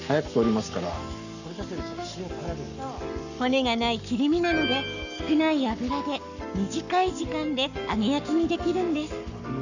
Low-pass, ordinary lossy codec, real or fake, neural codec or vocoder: 7.2 kHz; none; fake; codec, 44.1 kHz, 7.8 kbps, Pupu-Codec